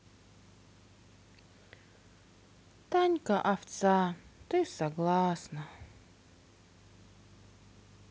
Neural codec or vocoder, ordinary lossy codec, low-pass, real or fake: none; none; none; real